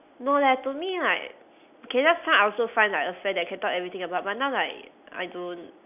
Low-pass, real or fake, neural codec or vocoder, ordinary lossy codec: 3.6 kHz; real; none; none